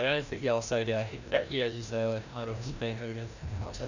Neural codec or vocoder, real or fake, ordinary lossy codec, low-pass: codec, 16 kHz, 1 kbps, FreqCodec, larger model; fake; none; 7.2 kHz